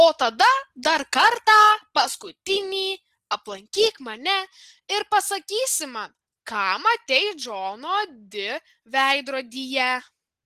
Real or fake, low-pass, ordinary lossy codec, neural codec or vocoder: real; 14.4 kHz; Opus, 16 kbps; none